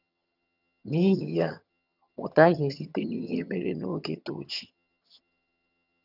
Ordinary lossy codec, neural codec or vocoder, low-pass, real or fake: none; vocoder, 22.05 kHz, 80 mel bands, HiFi-GAN; 5.4 kHz; fake